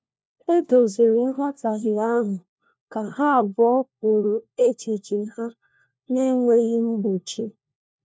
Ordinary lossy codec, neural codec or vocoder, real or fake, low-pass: none; codec, 16 kHz, 1 kbps, FunCodec, trained on LibriTTS, 50 frames a second; fake; none